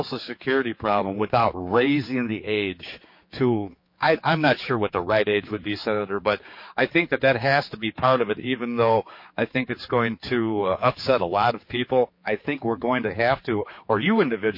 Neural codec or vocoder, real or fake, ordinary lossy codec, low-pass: codec, 16 kHz, 4 kbps, X-Codec, HuBERT features, trained on general audio; fake; MP3, 32 kbps; 5.4 kHz